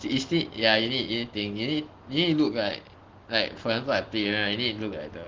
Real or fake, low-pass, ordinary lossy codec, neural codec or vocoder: real; 7.2 kHz; Opus, 16 kbps; none